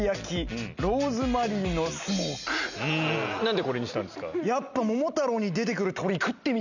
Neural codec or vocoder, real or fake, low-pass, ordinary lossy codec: none; real; 7.2 kHz; none